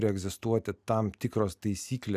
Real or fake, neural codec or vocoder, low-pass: real; none; 14.4 kHz